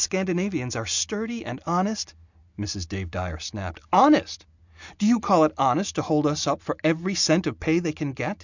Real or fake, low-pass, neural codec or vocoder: real; 7.2 kHz; none